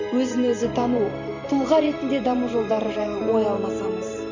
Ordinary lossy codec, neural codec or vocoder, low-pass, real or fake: MP3, 48 kbps; vocoder, 44.1 kHz, 128 mel bands every 512 samples, BigVGAN v2; 7.2 kHz; fake